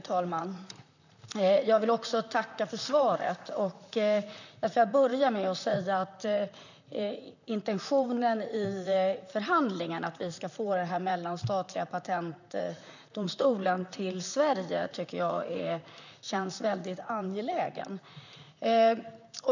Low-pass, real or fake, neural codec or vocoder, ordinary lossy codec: 7.2 kHz; fake; vocoder, 44.1 kHz, 128 mel bands, Pupu-Vocoder; none